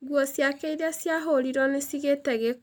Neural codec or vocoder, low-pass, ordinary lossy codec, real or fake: none; none; none; real